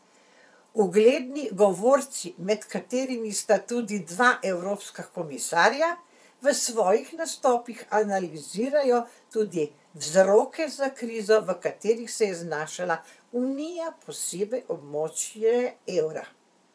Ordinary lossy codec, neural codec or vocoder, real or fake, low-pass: none; vocoder, 22.05 kHz, 80 mel bands, Vocos; fake; none